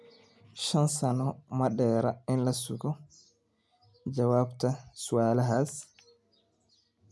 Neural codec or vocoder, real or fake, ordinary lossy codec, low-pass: none; real; none; none